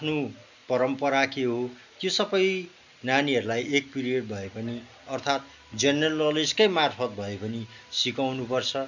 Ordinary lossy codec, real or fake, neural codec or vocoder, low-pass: none; real; none; 7.2 kHz